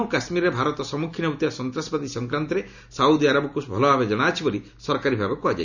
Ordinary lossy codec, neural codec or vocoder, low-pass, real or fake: none; none; 7.2 kHz; real